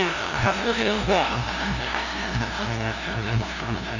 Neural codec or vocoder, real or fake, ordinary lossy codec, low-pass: codec, 16 kHz, 0.5 kbps, FunCodec, trained on LibriTTS, 25 frames a second; fake; none; 7.2 kHz